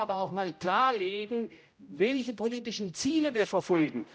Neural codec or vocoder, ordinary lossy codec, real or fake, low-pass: codec, 16 kHz, 0.5 kbps, X-Codec, HuBERT features, trained on general audio; none; fake; none